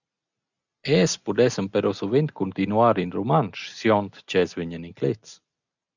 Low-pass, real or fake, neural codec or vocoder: 7.2 kHz; real; none